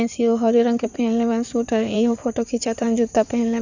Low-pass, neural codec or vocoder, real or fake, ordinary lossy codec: 7.2 kHz; codec, 16 kHz in and 24 kHz out, 2.2 kbps, FireRedTTS-2 codec; fake; none